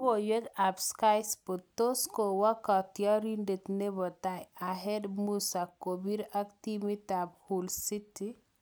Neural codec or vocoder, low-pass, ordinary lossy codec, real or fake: none; none; none; real